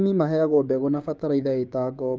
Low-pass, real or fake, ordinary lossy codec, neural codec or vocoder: none; fake; none; codec, 16 kHz, 6 kbps, DAC